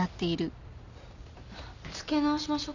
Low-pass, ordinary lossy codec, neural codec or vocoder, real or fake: 7.2 kHz; none; none; real